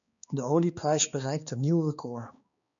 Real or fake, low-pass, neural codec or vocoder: fake; 7.2 kHz; codec, 16 kHz, 2 kbps, X-Codec, HuBERT features, trained on balanced general audio